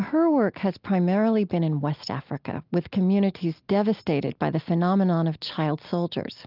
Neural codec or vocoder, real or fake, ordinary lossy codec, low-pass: none; real; Opus, 32 kbps; 5.4 kHz